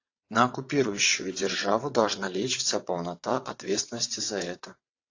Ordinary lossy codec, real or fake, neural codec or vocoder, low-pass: AAC, 48 kbps; fake; vocoder, 22.05 kHz, 80 mel bands, WaveNeXt; 7.2 kHz